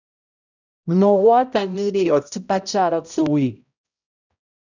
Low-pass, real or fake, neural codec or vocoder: 7.2 kHz; fake; codec, 16 kHz, 0.5 kbps, X-Codec, HuBERT features, trained on balanced general audio